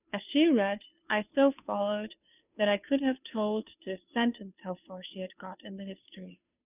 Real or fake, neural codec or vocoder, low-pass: fake; codec, 16 kHz, 2 kbps, FunCodec, trained on Chinese and English, 25 frames a second; 3.6 kHz